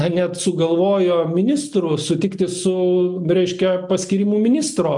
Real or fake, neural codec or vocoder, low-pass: real; none; 10.8 kHz